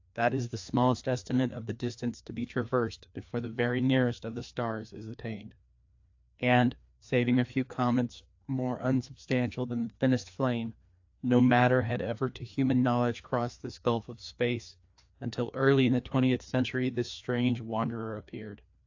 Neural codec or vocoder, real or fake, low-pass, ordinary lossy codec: codec, 16 kHz, 2 kbps, FreqCodec, larger model; fake; 7.2 kHz; AAC, 48 kbps